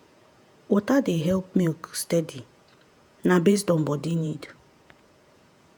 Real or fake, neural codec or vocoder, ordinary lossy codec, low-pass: fake; vocoder, 48 kHz, 128 mel bands, Vocos; none; none